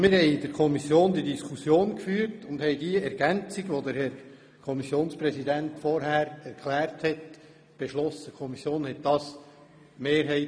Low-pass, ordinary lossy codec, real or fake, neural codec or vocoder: none; none; real; none